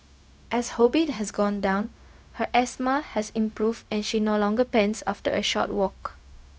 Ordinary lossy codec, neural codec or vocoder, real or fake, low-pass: none; codec, 16 kHz, 0.4 kbps, LongCat-Audio-Codec; fake; none